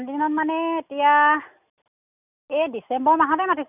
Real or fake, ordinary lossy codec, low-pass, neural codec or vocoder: real; none; 3.6 kHz; none